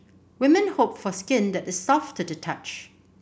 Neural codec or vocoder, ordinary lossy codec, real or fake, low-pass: none; none; real; none